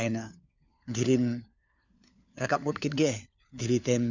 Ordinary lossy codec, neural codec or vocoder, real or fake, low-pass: none; codec, 16 kHz, 4 kbps, FunCodec, trained on LibriTTS, 50 frames a second; fake; 7.2 kHz